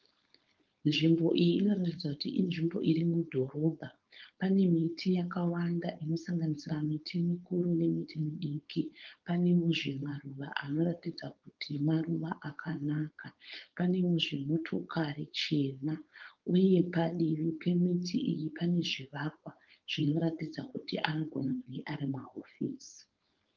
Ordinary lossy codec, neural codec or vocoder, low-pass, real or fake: Opus, 32 kbps; codec, 16 kHz, 4.8 kbps, FACodec; 7.2 kHz; fake